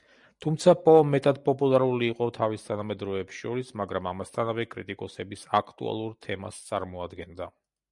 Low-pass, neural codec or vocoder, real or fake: 10.8 kHz; none; real